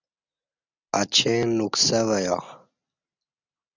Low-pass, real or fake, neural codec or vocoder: 7.2 kHz; real; none